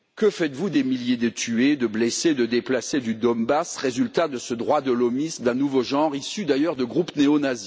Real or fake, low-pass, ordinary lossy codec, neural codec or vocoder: real; none; none; none